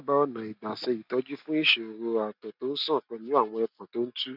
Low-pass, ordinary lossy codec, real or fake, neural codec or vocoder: 5.4 kHz; none; real; none